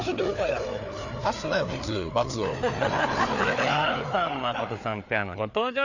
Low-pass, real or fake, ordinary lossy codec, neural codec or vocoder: 7.2 kHz; fake; none; codec, 16 kHz, 4 kbps, FreqCodec, larger model